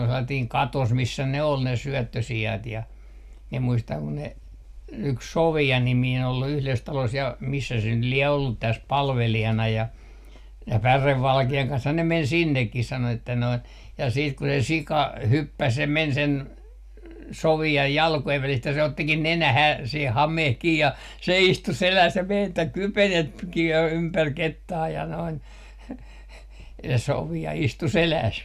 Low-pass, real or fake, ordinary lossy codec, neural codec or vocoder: 14.4 kHz; real; none; none